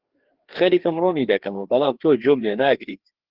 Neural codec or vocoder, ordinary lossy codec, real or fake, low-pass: codec, 16 kHz, 2 kbps, FreqCodec, larger model; Opus, 16 kbps; fake; 5.4 kHz